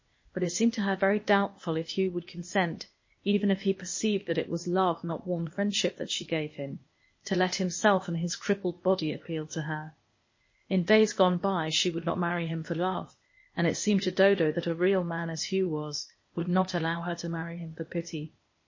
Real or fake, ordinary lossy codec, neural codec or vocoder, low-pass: fake; MP3, 32 kbps; codec, 16 kHz, 0.8 kbps, ZipCodec; 7.2 kHz